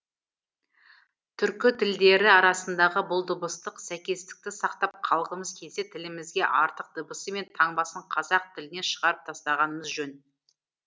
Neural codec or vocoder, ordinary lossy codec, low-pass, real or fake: none; none; none; real